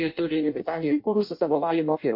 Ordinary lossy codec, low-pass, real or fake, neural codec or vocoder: MP3, 32 kbps; 5.4 kHz; fake; codec, 16 kHz in and 24 kHz out, 0.6 kbps, FireRedTTS-2 codec